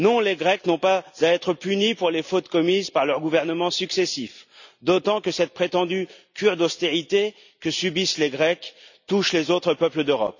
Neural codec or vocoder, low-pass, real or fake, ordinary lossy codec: none; 7.2 kHz; real; none